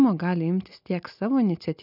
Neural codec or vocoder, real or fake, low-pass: none; real; 5.4 kHz